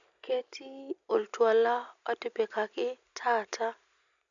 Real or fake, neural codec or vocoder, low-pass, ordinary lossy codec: real; none; 7.2 kHz; none